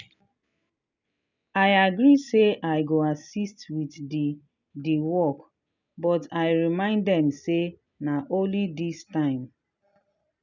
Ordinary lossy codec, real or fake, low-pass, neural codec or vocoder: none; real; 7.2 kHz; none